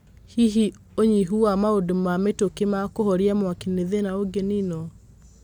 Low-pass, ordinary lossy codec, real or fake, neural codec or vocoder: 19.8 kHz; none; real; none